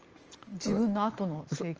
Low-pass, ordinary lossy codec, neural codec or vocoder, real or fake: 7.2 kHz; Opus, 24 kbps; none; real